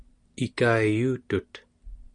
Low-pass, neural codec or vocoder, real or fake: 9.9 kHz; none; real